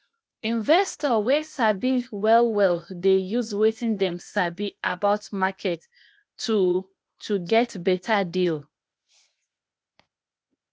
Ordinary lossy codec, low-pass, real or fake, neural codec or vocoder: none; none; fake; codec, 16 kHz, 0.8 kbps, ZipCodec